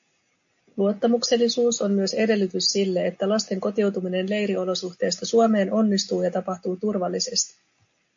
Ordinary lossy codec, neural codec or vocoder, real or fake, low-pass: AAC, 64 kbps; none; real; 7.2 kHz